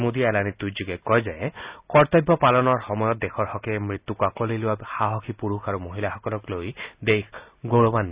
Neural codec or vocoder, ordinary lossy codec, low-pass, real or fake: none; Opus, 64 kbps; 3.6 kHz; real